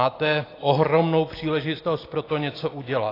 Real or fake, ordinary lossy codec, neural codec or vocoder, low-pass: real; AAC, 24 kbps; none; 5.4 kHz